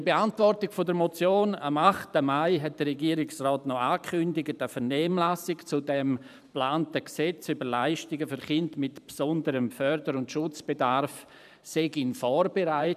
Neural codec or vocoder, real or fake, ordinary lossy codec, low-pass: vocoder, 44.1 kHz, 128 mel bands every 512 samples, BigVGAN v2; fake; none; 14.4 kHz